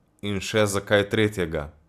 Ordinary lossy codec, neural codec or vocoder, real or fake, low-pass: AAC, 96 kbps; none; real; 14.4 kHz